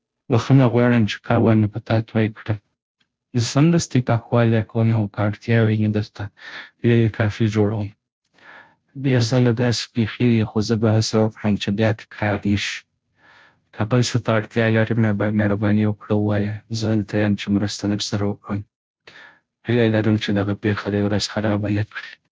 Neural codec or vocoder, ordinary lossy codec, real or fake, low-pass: codec, 16 kHz, 0.5 kbps, FunCodec, trained on Chinese and English, 25 frames a second; none; fake; none